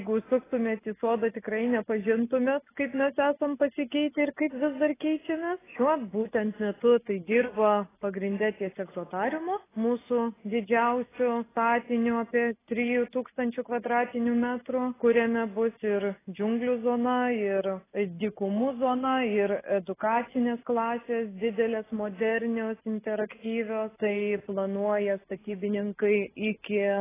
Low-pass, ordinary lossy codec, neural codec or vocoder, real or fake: 3.6 kHz; AAC, 16 kbps; none; real